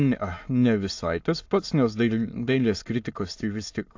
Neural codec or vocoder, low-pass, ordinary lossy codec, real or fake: autoencoder, 22.05 kHz, a latent of 192 numbers a frame, VITS, trained on many speakers; 7.2 kHz; AAC, 48 kbps; fake